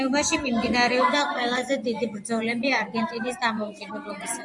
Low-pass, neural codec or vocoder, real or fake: 10.8 kHz; vocoder, 24 kHz, 100 mel bands, Vocos; fake